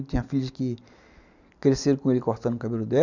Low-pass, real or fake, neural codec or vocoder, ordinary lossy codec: 7.2 kHz; real; none; none